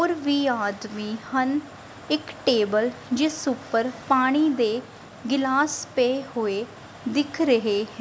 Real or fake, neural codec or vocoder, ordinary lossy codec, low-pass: real; none; none; none